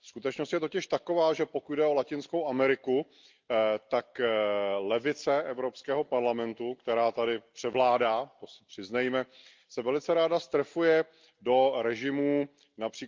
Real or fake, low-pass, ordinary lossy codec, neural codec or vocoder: real; 7.2 kHz; Opus, 24 kbps; none